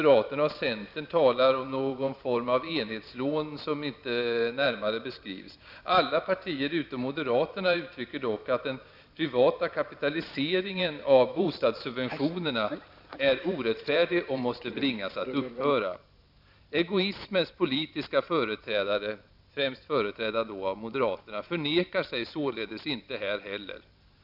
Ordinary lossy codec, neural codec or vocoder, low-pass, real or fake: none; vocoder, 44.1 kHz, 128 mel bands every 512 samples, BigVGAN v2; 5.4 kHz; fake